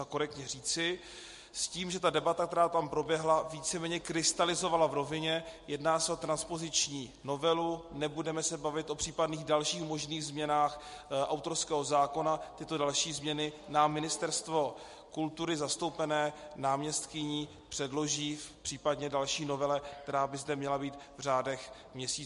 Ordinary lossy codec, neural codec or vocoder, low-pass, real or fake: MP3, 48 kbps; none; 14.4 kHz; real